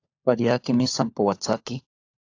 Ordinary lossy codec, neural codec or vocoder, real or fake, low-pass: AAC, 48 kbps; codec, 16 kHz, 16 kbps, FunCodec, trained on LibriTTS, 50 frames a second; fake; 7.2 kHz